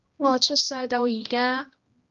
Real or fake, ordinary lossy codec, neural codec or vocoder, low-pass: fake; Opus, 32 kbps; codec, 16 kHz, 1 kbps, X-Codec, HuBERT features, trained on general audio; 7.2 kHz